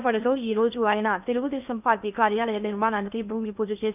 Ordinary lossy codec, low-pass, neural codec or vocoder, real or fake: none; 3.6 kHz; codec, 16 kHz in and 24 kHz out, 0.8 kbps, FocalCodec, streaming, 65536 codes; fake